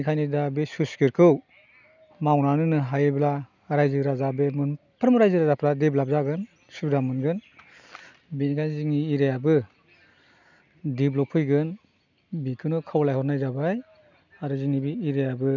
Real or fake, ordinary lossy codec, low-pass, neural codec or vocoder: real; none; 7.2 kHz; none